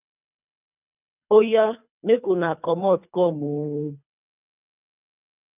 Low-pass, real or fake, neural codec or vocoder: 3.6 kHz; fake; codec, 24 kHz, 3 kbps, HILCodec